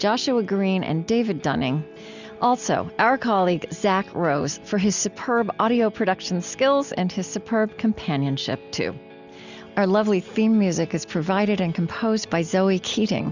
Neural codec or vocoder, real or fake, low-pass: none; real; 7.2 kHz